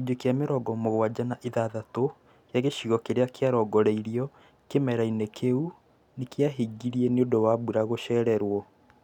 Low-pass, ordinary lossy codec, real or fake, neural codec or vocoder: 19.8 kHz; none; real; none